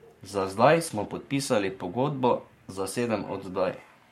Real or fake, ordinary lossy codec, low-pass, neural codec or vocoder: fake; MP3, 64 kbps; 19.8 kHz; codec, 44.1 kHz, 7.8 kbps, Pupu-Codec